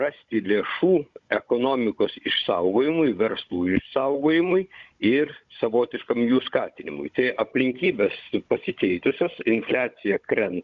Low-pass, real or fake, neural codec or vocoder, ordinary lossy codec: 7.2 kHz; fake; codec, 16 kHz, 16 kbps, FunCodec, trained on Chinese and English, 50 frames a second; Opus, 64 kbps